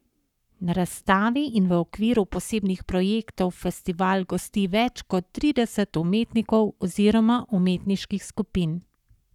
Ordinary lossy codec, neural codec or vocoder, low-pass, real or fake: none; codec, 44.1 kHz, 7.8 kbps, Pupu-Codec; 19.8 kHz; fake